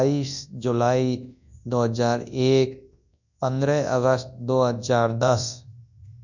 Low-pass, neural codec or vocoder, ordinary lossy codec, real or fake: 7.2 kHz; codec, 24 kHz, 0.9 kbps, WavTokenizer, large speech release; none; fake